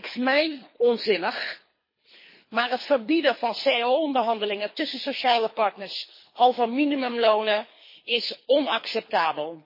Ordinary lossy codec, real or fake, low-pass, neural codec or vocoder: MP3, 24 kbps; fake; 5.4 kHz; codec, 24 kHz, 3 kbps, HILCodec